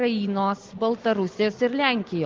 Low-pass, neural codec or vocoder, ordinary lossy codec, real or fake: 7.2 kHz; none; Opus, 16 kbps; real